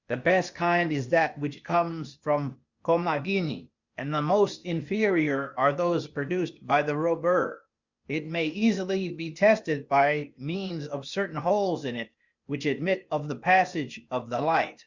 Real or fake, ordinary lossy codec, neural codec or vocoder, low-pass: fake; Opus, 64 kbps; codec, 16 kHz, 0.8 kbps, ZipCodec; 7.2 kHz